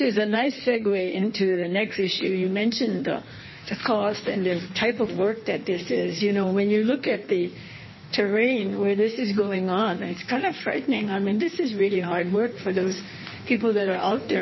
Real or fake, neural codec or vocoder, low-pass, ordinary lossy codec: fake; codec, 16 kHz in and 24 kHz out, 1.1 kbps, FireRedTTS-2 codec; 7.2 kHz; MP3, 24 kbps